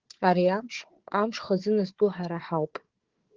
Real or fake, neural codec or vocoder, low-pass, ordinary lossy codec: fake; codec, 16 kHz, 4 kbps, FunCodec, trained on Chinese and English, 50 frames a second; 7.2 kHz; Opus, 16 kbps